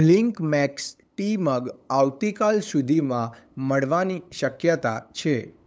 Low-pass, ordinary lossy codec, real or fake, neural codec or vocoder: none; none; fake; codec, 16 kHz, 8 kbps, FunCodec, trained on LibriTTS, 25 frames a second